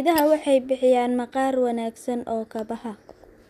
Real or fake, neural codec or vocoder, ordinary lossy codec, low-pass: real; none; none; 14.4 kHz